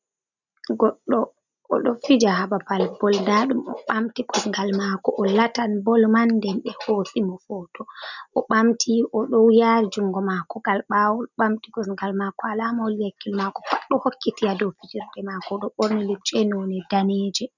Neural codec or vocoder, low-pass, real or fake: none; 7.2 kHz; real